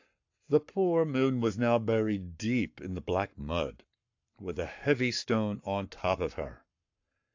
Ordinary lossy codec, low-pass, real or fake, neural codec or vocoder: AAC, 48 kbps; 7.2 kHz; fake; codec, 44.1 kHz, 7.8 kbps, Pupu-Codec